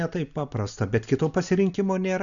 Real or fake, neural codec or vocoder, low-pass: real; none; 7.2 kHz